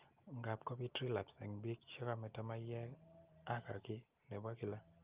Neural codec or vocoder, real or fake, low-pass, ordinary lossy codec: none; real; 3.6 kHz; Opus, 24 kbps